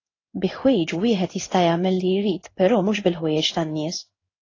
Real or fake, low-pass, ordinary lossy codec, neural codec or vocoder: fake; 7.2 kHz; AAC, 32 kbps; codec, 16 kHz in and 24 kHz out, 1 kbps, XY-Tokenizer